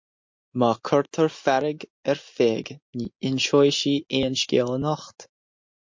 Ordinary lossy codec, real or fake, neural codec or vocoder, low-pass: MP3, 48 kbps; real; none; 7.2 kHz